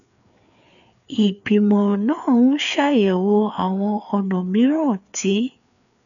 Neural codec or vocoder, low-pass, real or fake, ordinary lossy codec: codec, 16 kHz, 4 kbps, FreqCodec, larger model; 7.2 kHz; fake; none